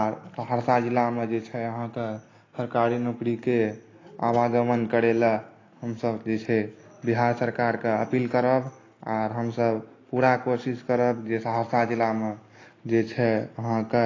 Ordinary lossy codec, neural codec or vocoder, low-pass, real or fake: AAC, 32 kbps; none; 7.2 kHz; real